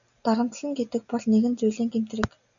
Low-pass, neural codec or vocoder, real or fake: 7.2 kHz; none; real